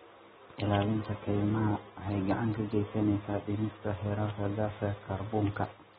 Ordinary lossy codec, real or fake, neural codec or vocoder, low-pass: AAC, 16 kbps; real; none; 19.8 kHz